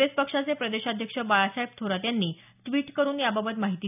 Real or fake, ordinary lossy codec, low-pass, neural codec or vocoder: real; none; 3.6 kHz; none